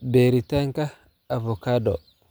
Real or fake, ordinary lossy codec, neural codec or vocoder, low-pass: real; none; none; none